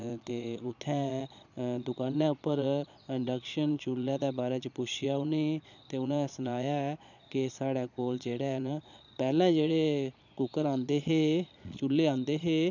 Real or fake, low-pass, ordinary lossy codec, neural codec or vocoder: fake; 7.2 kHz; none; vocoder, 22.05 kHz, 80 mel bands, Vocos